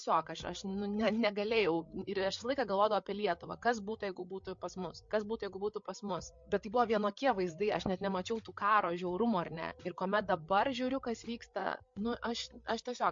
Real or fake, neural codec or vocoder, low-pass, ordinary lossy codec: fake; codec, 16 kHz, 8 kbps, FreqCodec, larger model; 7.2 kHz; MP3, 48 kbps